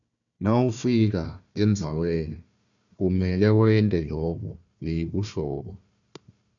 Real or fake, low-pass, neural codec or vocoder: fake; 7.2 kHz; codec, 16 kHz, 1 kbps, FunCodec, trained on Chinese and English, 50 frames a second